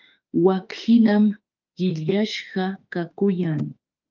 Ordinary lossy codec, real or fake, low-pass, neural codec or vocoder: Opus, 24 kbps; fake; 7.2 kHz; autoencoder, 48 kHz, 32 numbers a frame, DAC-VAE, trained on Japanese speech